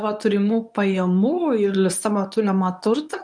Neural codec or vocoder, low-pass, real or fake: codec, 24 kHz, 0.9 kbps, WavTokenizer, medium speech release version 1; 9.9 kHz; fake